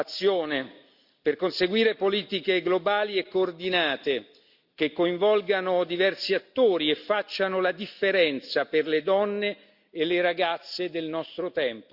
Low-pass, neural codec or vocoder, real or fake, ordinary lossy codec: 5.4 kHz; none; real; Opus, 64 kbps